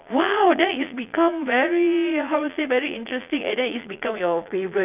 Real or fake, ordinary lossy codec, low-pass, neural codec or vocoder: fake; none; 3.6 kHz; vocoder, 22.05 kHz, 80 mel bands, Vocos